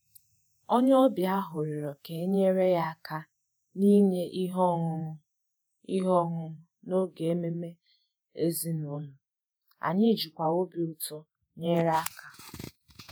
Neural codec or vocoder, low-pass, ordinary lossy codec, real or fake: vocoder, 48 kHz, 128 mel bands, Vocos; none; none; fake